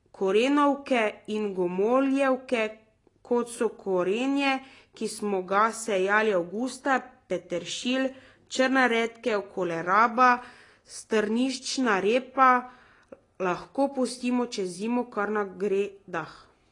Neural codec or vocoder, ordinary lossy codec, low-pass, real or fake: none; AAC, 32 kbps; 10.8 kHz; real